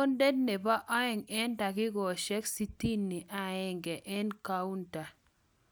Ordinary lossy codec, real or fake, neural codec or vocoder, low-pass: none; real; none; none